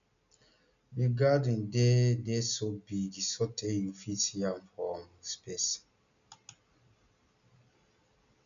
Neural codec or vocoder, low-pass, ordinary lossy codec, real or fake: none; 7.2 kHz; none; real